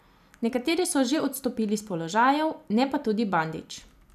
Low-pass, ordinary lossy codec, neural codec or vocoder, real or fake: 14.4 kHz; none; vocoder, 44.1 kHz, 128 mel bands every 256 samples, BigVGAN v2; fake